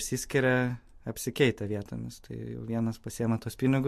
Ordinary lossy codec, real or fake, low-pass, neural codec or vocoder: MP3, 64 kbps; real; 14.4 kHz; none